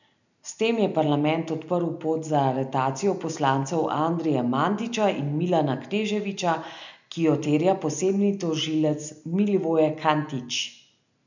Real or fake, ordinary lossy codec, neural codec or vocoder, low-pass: real; none; none; 7.2 kHz